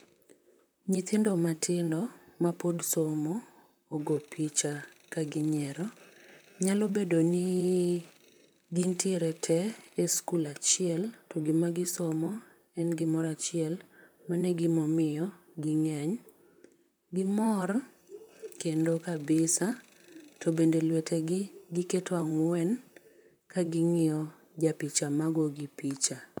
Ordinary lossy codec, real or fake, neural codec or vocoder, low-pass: none; fake; vocoder, 44.1 kHz, 128 mel bands, Pupu-Vocoder; none